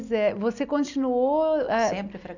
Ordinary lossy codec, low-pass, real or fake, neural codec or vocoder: none; 7.2 kHz; real; none